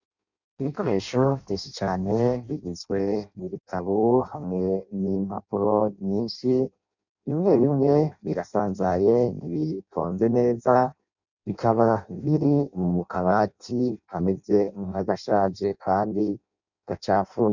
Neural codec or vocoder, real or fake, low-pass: codec, 16 kHz in and 24 kHz out, 0.6 kbps, FireRedTTS-2 codec; fake; 7.2 kHz